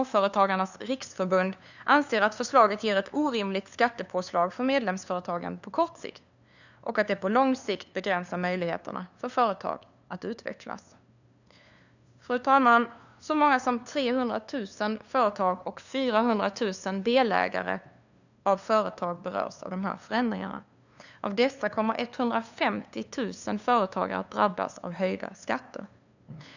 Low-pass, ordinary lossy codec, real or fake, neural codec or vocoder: 7.2 kHz; none; fake; codec, 16 kHz, 2 kbps, FunCodec, trained on LibriTTS, 25 frames a second